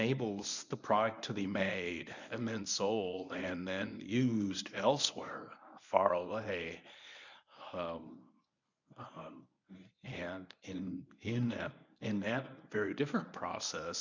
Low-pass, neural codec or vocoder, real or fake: 7.2 kHz; codec, 24 kHz, 0.9 kbps, WavTokenizer, medium speech release version 1; fake